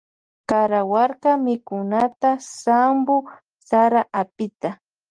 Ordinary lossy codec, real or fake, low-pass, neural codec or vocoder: Opus, 24 kbps; real; 9.9 kHz; none